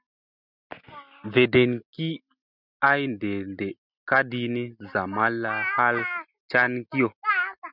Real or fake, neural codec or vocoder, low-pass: real; none; 5.4 kHz